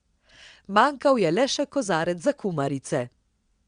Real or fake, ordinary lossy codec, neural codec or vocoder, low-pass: fake; Opus, 64 kbps; vocoder, 22.05 kHz, 80 mel bands, WaveNeXt; 9.9 kHz